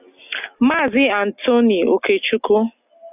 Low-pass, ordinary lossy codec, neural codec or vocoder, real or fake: 3.6 kHz; AAC, 32 kbps; none; real